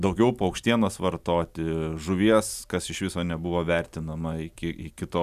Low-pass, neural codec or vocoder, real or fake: 14.4 kHz; none; real